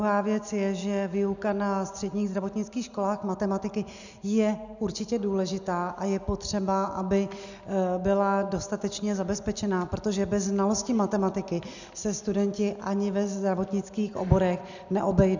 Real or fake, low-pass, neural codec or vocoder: real; 7.2 kHz; none